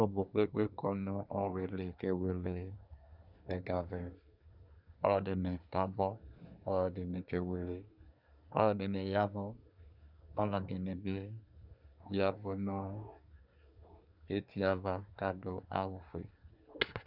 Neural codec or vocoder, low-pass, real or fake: codec, 24 kHz, 1 kbps, SNAC; 5.4 kHz; fake